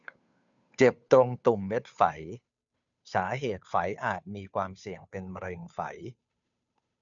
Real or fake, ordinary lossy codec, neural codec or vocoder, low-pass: fake; none; codec, 16 kHz, 2 kbps, FunCodec, trained on Chinese and English, 25 frames a second; 7.2 kHz